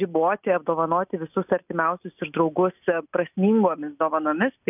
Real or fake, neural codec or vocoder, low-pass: real; none; 3.6 kHz